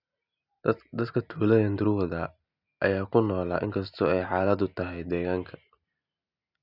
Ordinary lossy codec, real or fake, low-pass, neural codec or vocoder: none; real; 5.4 kHz; none